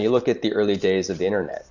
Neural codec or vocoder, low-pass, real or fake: none; 7.2 kHz; real